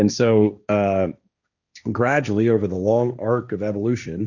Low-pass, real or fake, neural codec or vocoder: 7.2 kHz; fake; codec, 16 kHz, 1.1 kbps, Voila-Tokenizer